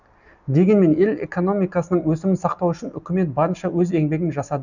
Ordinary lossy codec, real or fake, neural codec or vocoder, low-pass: none; real; none; 7.2 kHz